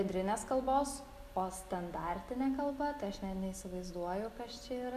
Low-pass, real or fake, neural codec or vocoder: 14.4 kHz; real; none